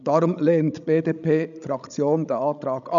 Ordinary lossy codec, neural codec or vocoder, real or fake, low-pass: none; codec, 16 kHz, 16 kbps, FreqCodec, larger model; fake; 7.2 kHz